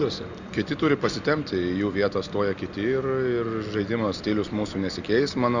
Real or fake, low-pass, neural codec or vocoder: real; 7.2 kHz; none